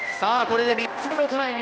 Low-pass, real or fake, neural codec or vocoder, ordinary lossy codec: none; fake; codec, 16 kHz, 1 kbps, X-Codec, HuBERT features, trained on balanced general audio; none